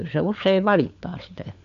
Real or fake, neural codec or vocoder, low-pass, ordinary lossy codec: fake; codec, 16 kHz, 4 kbps, FunCodec, trained on LibriTTS, 50 frames a second; 7.2 kHz; none